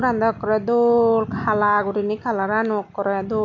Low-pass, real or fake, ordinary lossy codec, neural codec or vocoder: 7.2 kHz; real; none; none